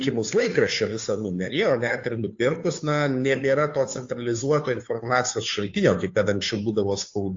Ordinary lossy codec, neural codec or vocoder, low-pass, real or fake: AAC, 48 kbps; codec, 16 kHz, 2 kbps, FunCodec, trained on Chinese and English, 25 frames a second; 7.2 kHz; fake